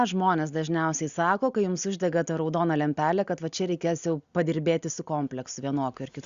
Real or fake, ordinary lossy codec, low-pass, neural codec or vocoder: real; Opus, 64 kbps; 7.2 kHz; none